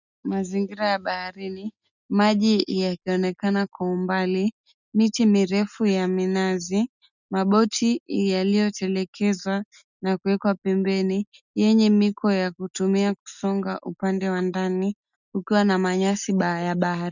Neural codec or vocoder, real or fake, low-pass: none; real; 7.2 kHz